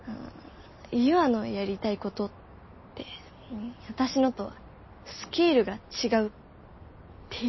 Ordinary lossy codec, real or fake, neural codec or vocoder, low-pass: MP3, 24 kbps; real; none; 7.2 kHz